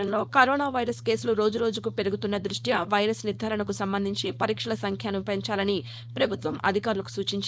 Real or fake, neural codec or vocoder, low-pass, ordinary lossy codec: fake; codec, 16 kHz, 4.8 kbps, FACodec; none; none